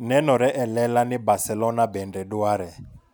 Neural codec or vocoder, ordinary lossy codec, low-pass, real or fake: none; none; none; real